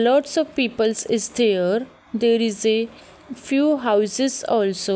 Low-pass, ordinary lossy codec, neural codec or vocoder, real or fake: none; none; none; real